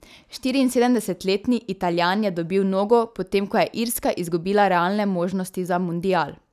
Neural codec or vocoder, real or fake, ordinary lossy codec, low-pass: none; real; none; 14.4 kHz